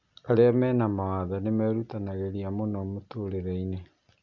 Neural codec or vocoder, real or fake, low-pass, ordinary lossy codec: none; real; 7.2 kHz; Opus, 64 kbps